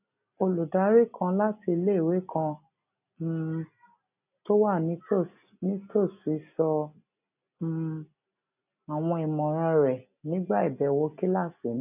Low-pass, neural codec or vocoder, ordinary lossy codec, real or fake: 3.6 kHz; none; none; real